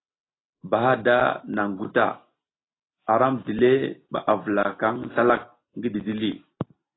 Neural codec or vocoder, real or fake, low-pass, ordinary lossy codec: none; real; 7.2 kHz; AAC, 16 kbps